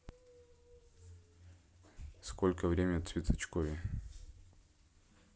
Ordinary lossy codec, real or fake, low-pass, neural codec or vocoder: none; real; none; none